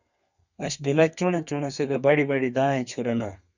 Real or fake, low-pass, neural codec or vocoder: fake; 7.2 kHz; codec, 32 kHz, 1.9 kbps, SNAC